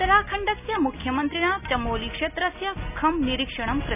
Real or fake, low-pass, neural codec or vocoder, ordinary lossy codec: real; 3.6 kHz; none; none